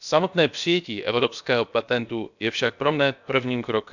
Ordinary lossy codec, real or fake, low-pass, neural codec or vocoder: none; fake; 7.2 kHz; codec, 16 kHz, about 1 kbps, DyCAST, with the encoder's durations